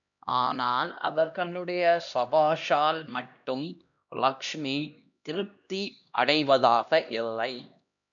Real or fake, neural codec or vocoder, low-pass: fake; codec, 16 kHz, 1 kbps, X-Codec, HuBERT features, trained on LibriSpeech; 7.2 kHz